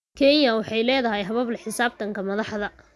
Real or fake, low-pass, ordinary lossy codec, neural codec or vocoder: real; none; none; none